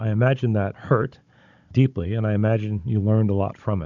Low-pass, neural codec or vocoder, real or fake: 7.2 kHz; codec, 16 kHz, 16 kbps, FunCodec, trained on Chinese and English, 50 frames a second; fake